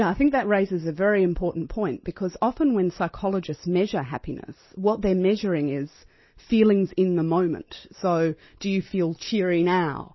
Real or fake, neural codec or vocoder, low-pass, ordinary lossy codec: real; none; 7.2 kHz; MP3, 24 kbps